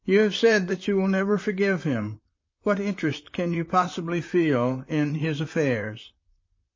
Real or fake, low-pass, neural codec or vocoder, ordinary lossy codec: fake; 7.2 kHz; vocoder, 44.1 kHz, 128 mel bands, Pupu-Vocoder; MP3, 32 kbps